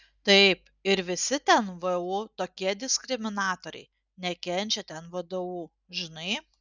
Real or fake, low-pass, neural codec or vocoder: real; 7.2 kHz; none